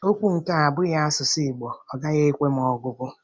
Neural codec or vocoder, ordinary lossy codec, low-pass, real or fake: none; none; none; real